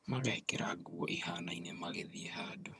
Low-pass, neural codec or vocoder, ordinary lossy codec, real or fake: none; vocoder, 22.05 kHz, 80 mel bands, HiFi-GAN; none; fake